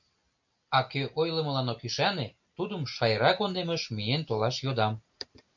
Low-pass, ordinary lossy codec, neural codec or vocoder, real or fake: 7.2 kHz; MP3, 64 kbps; none; real